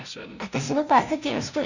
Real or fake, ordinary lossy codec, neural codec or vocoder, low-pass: fake; none; codec, 16 kHz, 0.5 kbps, FunCodec, trained on LibriTTS, 25 frames a second; 7.2 kHz